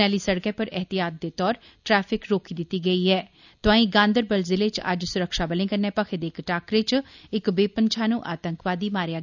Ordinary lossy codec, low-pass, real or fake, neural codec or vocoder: none; 7.2 kHz; real; none